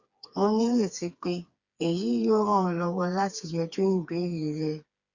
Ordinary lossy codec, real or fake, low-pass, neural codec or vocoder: Opus, 64 kbps; fake; 7.2 kHz; codec, 16 kHz, 4 kbps, FreqCodec, smaller model